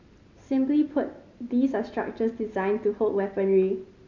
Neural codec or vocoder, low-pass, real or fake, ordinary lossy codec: none; 7.2 kHz; real; MP3, 64 kbps